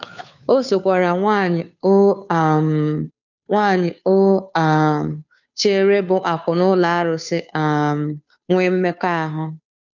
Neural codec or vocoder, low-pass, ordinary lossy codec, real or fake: codec, 16 kHz, 2 kbps, FunCodec, trained on Chinese and English, 25 frames a second; 7.2 kHz; none; fake